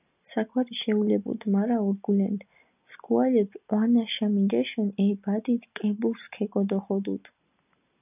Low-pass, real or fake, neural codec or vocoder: 3.6 kHz; real; none